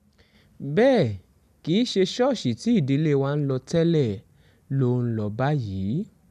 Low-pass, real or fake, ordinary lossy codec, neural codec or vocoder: 14.4 kHz; real; none; none